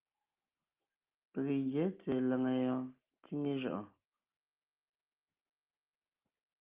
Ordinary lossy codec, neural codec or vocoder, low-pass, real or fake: Opus, 24 kbps; none; 3.6 kHz; real